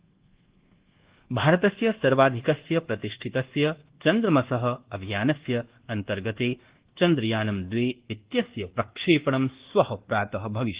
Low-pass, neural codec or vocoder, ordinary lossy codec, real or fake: 3.6 kHz; codec, 24 kHz, 1.2 kbps, DualCodec; Opus, 16 kbps; fake